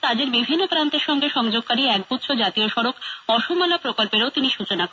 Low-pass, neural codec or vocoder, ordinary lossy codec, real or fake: none; none; none; real